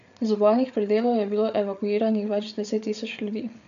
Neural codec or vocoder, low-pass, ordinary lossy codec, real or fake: codec, 16 kHz, 8 kbps, FreqCodec, larger model; 7.2 kHz; none; fake